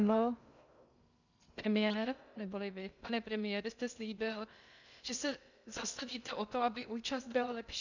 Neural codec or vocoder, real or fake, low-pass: codec, 16 kHz in and 24 kHz out, 0.6 kbps, FocalCodec, streaming, 2048 codes; fake; 7.2 kHz